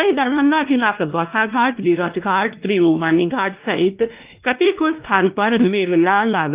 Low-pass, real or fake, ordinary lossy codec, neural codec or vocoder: 3.6 kHz; fake; Opus, 32 kbps; codec, 16 kHz, 1 kbps, X-Codec, WavLM features, trained on Multilingual LibriSpeech